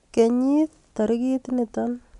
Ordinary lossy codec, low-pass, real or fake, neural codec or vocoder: none; 10.8 kHz; real; none